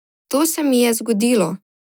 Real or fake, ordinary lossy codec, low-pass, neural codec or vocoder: real; none; none; none